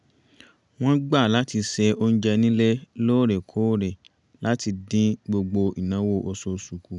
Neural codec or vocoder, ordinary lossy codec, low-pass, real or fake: none; none; 10.8 kHz; real